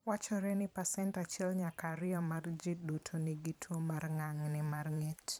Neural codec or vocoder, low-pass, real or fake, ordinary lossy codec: vocoder, 44.1 kHz, 128 mel bands every 512 samples, BigVGAN v2; none; fake; none